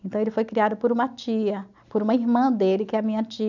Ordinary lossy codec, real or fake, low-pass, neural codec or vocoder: none; real; 7.2 kHz; none